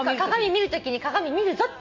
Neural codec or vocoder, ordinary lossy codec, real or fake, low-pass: none; MP3, 64 kbps; real; 7.2 kHz